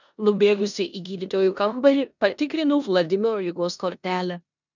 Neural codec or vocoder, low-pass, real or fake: codec, 16 kHz in and 24 kHz out, 0.9 kbps, LongCat-Audio-Codec, four codebook decoder; 7.2 kHz; fake